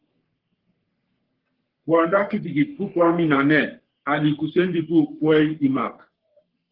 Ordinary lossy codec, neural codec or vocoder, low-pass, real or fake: Opus, 16 kbps; codec, 44.1 kHz, 3.4 kbps, Pupu-Codec; 5.4 kHz; fake